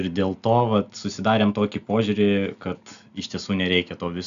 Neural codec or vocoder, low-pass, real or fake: none; 7.2 kHz; real